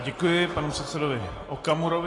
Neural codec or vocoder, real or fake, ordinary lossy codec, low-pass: none; real; AAC, 32 kbps; 10.8 kHz